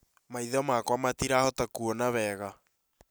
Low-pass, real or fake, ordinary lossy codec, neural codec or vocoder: none; real; none; none